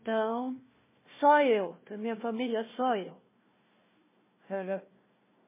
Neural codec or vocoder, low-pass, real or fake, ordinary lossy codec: codec, 16 kHz, 1 kbps, FunCodec, trained on Chinese and English, 50 frames a second; 3.6 kHz; fake; MP3, 16 kbps